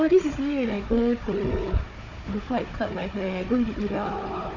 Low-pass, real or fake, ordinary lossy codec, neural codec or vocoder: 7.2 kHz; fake; none; codec, 16 kHz, 4 kbps, FunCodec, trained on Chinese and English, 50 frames a second